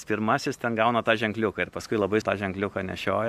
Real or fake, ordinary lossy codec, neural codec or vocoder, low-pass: real; MP3, 96 kbps; none; 14.4 kHz